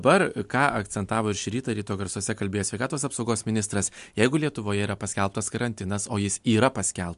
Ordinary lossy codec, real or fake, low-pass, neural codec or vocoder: MP3, 64 kbps; real; 10.8 kHz; none